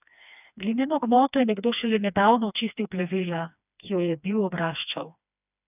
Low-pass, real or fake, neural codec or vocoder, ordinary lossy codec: 3.6 kHz; fake; codec, 16 kHz, 2 kbps, FreqCodec, smaller model; none